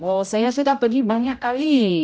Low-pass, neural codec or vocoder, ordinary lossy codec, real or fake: none; codec, 16 kHz, 0.5 kbps, X-Codec, HuBERT features, trained on general audio; none; fake